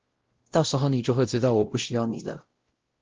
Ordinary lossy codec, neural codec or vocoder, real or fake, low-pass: Opus, 16 kbps; codec, 16 kHz, 0.5 kbps, X-Codec, WavLM features, trained on Multilingual LibriSpeech; fake; 7.2 kHz